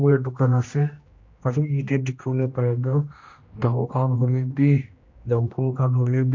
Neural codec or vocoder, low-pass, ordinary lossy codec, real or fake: codec, 16 kHz, 1 kbps, X-Codec, HuBERT features, trained on general audio; 7.2 kHz; MP3, 48 kbps; fake